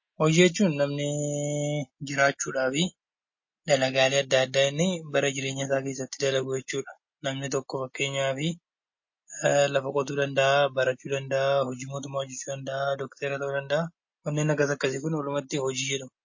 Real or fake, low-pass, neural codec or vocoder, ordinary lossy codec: real; 7.2 kHz; none; MP3, 32 kbps